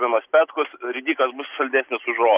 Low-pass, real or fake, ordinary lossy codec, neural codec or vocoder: 3.6 kHz; real; Opus, 64 kbps; none